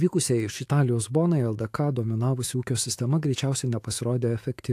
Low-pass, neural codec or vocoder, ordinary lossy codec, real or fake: 14.4 kHz; autoencoder, 48 kHz, 128 numbers a frame, DAC-VAE, trained on Japanese speech; AAC, 64 kbps; fake